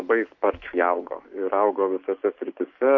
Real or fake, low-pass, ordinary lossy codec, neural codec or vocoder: real; 7.2 kHz; MP3, 48 kbps; none